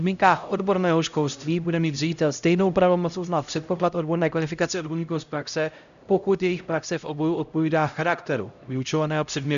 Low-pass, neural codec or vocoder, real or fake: 7.2 kHz; codec, 16 kHz, 0.5 kbps, X-Codec, HuBERT features, trained on LibriSpeech; fake